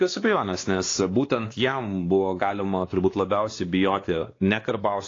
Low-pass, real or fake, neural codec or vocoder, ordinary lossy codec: 7.2 kHz; fake; codec, 16 kHz, 2 kbps, X-Codec, WavLM features, trained on Multilingual LibriSpeech; AAC, 32 kbps